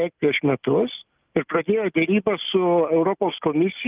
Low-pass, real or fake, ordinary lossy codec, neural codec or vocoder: 3.6 kHz; real; Opus, 24 kbps; none